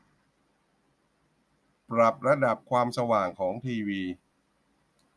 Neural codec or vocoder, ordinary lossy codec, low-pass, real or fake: none; none; none; real